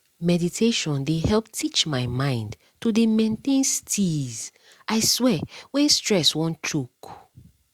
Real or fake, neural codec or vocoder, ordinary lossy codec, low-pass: real; none; Opus, 64 kbps; 19.8 kHz